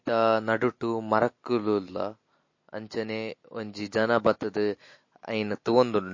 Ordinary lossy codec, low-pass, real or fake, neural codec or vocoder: MP3, 32 kbps; 7.2 kHz; real; none